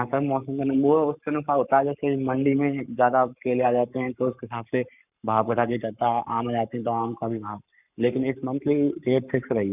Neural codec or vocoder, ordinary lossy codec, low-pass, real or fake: codec, 44.1 kHz, 7.8 kbps, Pupu-Codec; none; 3.6 kHz; fake